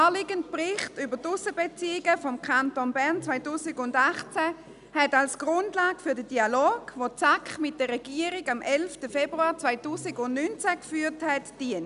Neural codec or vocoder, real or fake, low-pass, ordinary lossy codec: none; real; 10.8 kHz; none